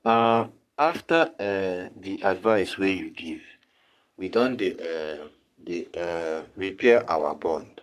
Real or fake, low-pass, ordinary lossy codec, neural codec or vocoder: fake; 14.4 kHz; none; codec, 44.1 kHz, 3.4 kbps, Pupu-Codec